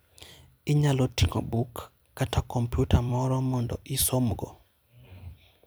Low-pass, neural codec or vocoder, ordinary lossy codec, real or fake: none; vocoder, 44.1 kHz, 128 mel bands every 256 samples, BigVGAN v2; none; fake